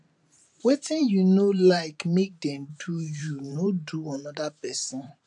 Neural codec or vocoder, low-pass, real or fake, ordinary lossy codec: vocoder, 44.1 kHz, 128 mel bands every 512 samples, BigVGAN v2; 10.8 kHz; fake; none